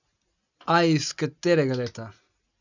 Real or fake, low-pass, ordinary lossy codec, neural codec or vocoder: real; 7.2 kHz; none; none